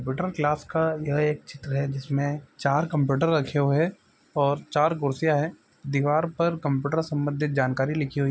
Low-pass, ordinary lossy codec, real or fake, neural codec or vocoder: none; none; real; none